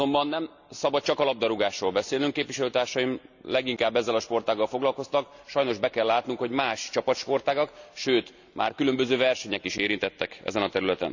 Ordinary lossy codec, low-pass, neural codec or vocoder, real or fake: none; 7.2 kHz; none; real